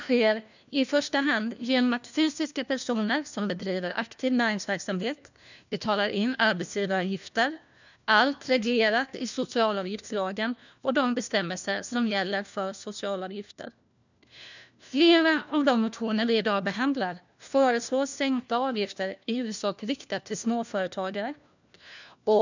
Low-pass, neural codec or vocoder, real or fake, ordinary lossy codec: 7.2 kHz; codec, 16 kHz, 1 kbps, FunCodec, trained on LibriTTS, 50 frames a second; fake; none